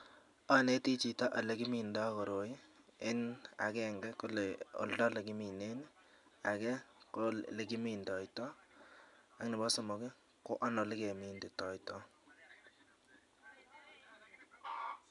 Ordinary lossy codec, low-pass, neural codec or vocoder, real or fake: none; 10.8 kHz; none; real